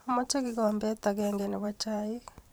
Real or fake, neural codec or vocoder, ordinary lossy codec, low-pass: fake; vocoder, 44.1 kHz, 128 mel bands every 512 samples, BigVGAN v2; none; none